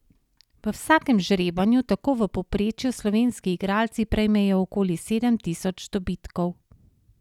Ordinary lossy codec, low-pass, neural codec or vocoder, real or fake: none; 19.8 kHz; none; real